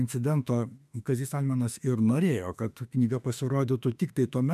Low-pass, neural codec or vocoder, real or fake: 14.4 kHz; autoencoder, 48 kHz, 32 numbers a frame, DAC-VAE, trained on Japanese speech; fake